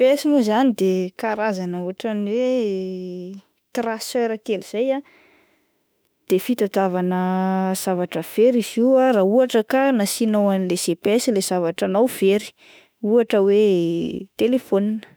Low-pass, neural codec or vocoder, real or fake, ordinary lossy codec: none; autoencoder, 48 kHz, 32 numbers a frame, DAC-VAE, trained on Japanese speech; fake; none